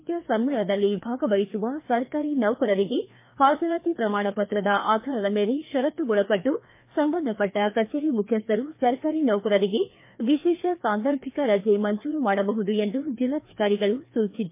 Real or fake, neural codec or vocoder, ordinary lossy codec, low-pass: fake; codec, 16 kHz, 2 kbps, FreqCodec, larger model; MP3, 24 kbps; 3.6 kHz